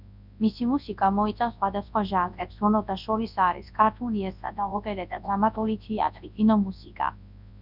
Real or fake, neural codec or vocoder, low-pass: fake; codec, 24 kHz, 0.9 kbps, WavTokenizer, large speech release; 5.4 kHz